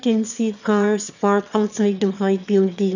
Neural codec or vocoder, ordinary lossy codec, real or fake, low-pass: autoencoder, 22.05 kHz, a latent of 192 numbers a frame, VITS, trained on one speaker; none; fake; 7.2 kHz